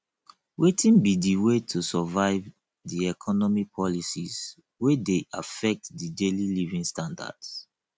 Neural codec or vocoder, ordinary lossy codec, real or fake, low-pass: none; none; real; none